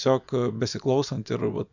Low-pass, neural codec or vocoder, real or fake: 7.2 kHz; vocoder, 24 kHz, 100 mel bands, Vocos; fake